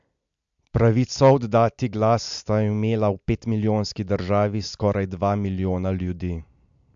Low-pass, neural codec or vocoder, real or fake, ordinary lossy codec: 7.2 kHz; none; real; MP3, 64 kbps